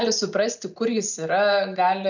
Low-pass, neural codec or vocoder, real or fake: 7.2 kHz; none; real